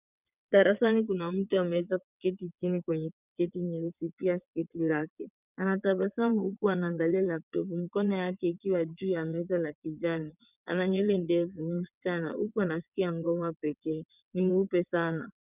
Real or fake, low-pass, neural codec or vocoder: fake; 3.6 kHz; vocoder, 22.05 kHz, 80 mel bands, WaveNeXt